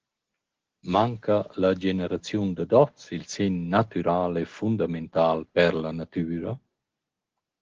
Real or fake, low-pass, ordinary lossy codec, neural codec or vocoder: real; 7.2 kHz; Opus, 16 kbps; none